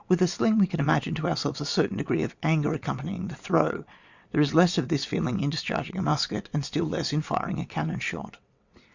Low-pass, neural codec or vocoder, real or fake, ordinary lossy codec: 7.2 kHz; vocoder, 22.05 kHz, 80 mel bands, WaveNeXt; fake; Opus, 64 kbps